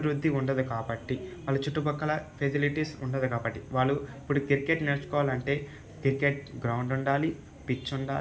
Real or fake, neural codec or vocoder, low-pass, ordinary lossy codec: real; none; none; none